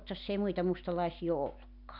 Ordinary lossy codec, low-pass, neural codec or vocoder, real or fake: none; 5.4 kHz; none; real